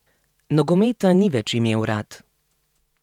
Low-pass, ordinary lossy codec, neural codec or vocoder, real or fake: 19.8 kHz; none; vocoder, 48 kHz, 128 mel bands, Vocos; fake